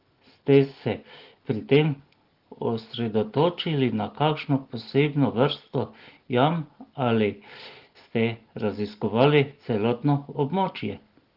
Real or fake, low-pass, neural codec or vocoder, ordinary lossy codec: real; 5.4 kHz; none; Opus, 16 kbps